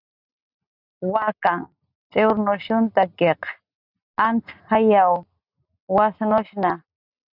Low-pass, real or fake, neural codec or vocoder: 5.4 kHz; real; none